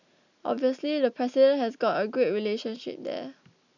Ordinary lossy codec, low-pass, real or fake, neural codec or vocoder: none; 7.2 kHz; real; none